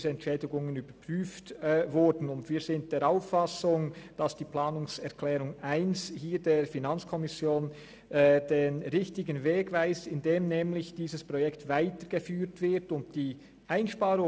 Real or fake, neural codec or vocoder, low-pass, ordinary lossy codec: real; none; none; none